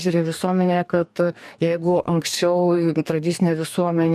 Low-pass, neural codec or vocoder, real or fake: 14.4 kHz; codec, 44.1 kHz, 2.6 kbps, DAC; fake